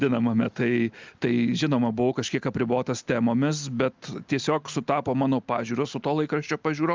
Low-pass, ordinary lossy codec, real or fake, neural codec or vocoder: 7.2 kHz; Opus, 24 kbps; real; none